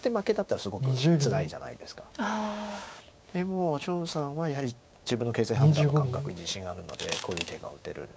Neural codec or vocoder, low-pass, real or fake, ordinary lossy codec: codec, 16 kHz, 6 kbps, DAC; none; fake; none